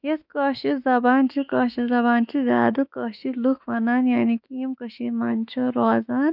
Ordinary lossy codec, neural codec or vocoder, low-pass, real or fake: none; autoencoder, 48 kHz, 32 numbers a frame, DAC-VAE, trained on Japanese speech; 5.4 kHz; fake